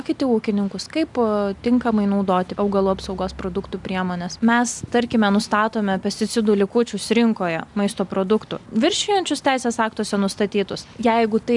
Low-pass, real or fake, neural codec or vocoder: 10.8 kHz; real; none